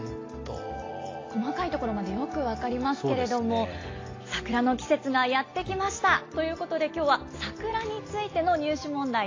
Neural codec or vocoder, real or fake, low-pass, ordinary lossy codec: none; real; 7.2 kHz; AAC, 32 kbps